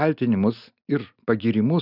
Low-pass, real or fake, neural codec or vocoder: 5.4 kHz; real; none